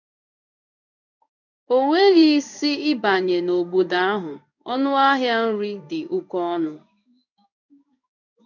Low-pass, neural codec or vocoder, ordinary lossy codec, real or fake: 7.2 kHz; codec, 16 kHz in and 24 kHz out, 1 kbps, XY-Tokenizer; none; fake